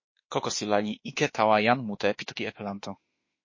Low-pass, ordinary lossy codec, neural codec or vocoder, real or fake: 7.2 kHz; MP3, 32 kbps; autoencoder, 48 kHz, 32 numbers a frame, DAC-VAE, trained on Japanese speech; fake